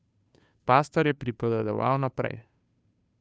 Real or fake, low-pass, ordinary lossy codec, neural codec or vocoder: fake; none; none; codec, 16 kHz, 2 kbps, FunCodec, trained on Chinese and English, 25 frames a second